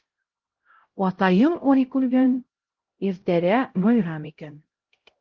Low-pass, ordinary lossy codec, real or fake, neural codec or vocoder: 7.2 kHz; Opus, 16 kbps; fake; codec, 16 kHz, 0.5 kbps, X-Codec, HuBERT features, trained on LibriSpeech